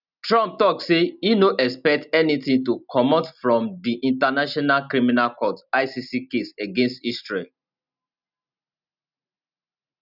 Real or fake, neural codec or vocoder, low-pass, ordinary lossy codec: real; none; 5.4 kHz; none